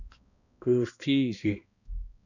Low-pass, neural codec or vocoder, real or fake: 7.2 kHz; codec, 16 kHz, 1 kbps, X-Codec, HuBERT features, trained on balanced general audio; fake